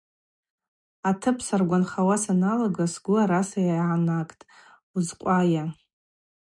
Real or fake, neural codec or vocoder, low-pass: real; none; 10.8 kHz